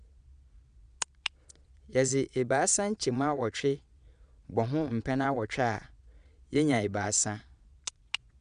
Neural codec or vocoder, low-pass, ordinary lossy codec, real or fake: vocoder, 22.05 kHz, 80 mel bands, Vocos; 9.9 kHz; none; fake